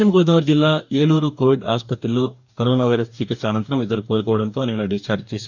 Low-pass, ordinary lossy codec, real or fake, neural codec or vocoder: 7.2 kHz; none; fake; codec, 44.1 kHz, 2.6 kbps, DAC